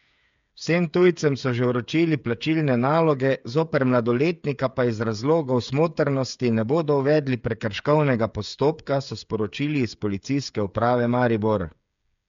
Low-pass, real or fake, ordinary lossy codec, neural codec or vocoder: 7.2 kHz; fake; MP3, 64 kbps; codec, 16 kHz, 8 kbps, FreqCodec, smaller model